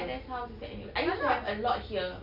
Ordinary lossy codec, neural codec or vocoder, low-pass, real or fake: none; none; 5.4 kHz; real